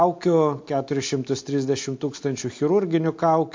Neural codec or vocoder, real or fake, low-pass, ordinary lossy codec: none; real; 7.2 kHz; MP3, 48 kbps